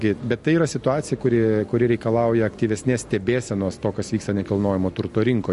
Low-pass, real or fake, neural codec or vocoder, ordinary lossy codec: 14.4 kHz; real; none; MP3, 48 kbps